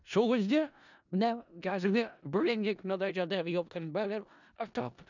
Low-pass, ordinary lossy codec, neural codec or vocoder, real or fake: 7.2 kHz; none; codec, 16 kHz in and 24 kHz out, 0.4 kbps, LongCat-Audio-Codec, four codebook decoder; fake